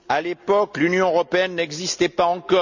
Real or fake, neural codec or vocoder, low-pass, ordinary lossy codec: real; none; 7.2 kHz; none